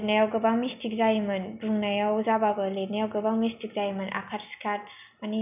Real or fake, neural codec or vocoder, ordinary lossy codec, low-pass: real; none; none; 3.6 kHz